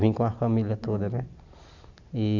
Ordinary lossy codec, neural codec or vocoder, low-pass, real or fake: none; none; 7.2 kHz; real